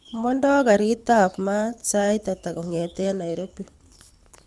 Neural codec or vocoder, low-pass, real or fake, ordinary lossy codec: codec, 24 kHz, 6 kbps, HILCodec; none; fake; none